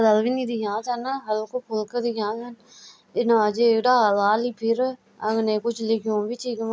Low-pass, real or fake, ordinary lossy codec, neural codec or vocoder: none; real; none; none